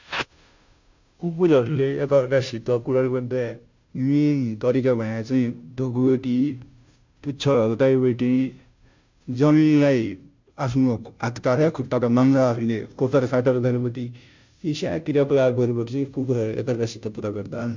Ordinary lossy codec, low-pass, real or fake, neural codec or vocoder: MP3, 64 kbps; 7.2 kHz; fake; codec, 16 kHz, 0.5 kbps, FunCodec, trained on Chinese and English, 25 frames a second